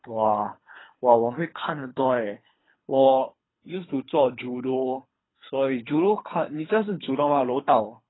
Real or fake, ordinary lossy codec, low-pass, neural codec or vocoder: fake; AAC, 16 kbps; 7.2 kHz; codec, 24 kHz, 3 kbps, HILCodec